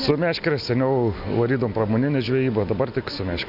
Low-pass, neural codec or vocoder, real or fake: 5.4 kHz; none; real